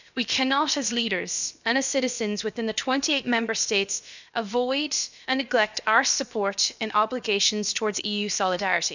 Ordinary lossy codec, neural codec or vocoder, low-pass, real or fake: none; codec, 16 kHz, about 1 kbps, DyCAST, with the encoder's durations; 7.2 kHz; fake